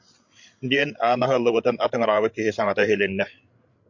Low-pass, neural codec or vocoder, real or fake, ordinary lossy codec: 7.2 kHz; codec, 16 kHz, 16 kbps, FreqCodec, larger model; fake; MP3, 48 kbps